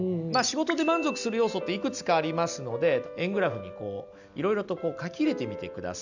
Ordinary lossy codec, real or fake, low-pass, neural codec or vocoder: none; real; 7.2 kHz; none